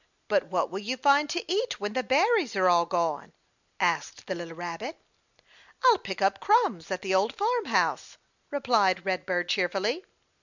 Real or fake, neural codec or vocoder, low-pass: real; none; 7.2 kHz